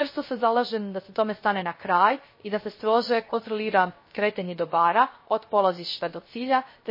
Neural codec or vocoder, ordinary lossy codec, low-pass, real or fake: codec, 16 kHz, 0.3 kbps, FocalCodec; MP3, 24 kbps; 5.4 kHz; fake